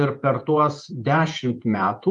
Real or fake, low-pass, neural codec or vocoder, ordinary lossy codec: fake; 10.8 kHz; vocoder, 48 kHz, 128 mel bands, Vocos; Opus, 32 kbps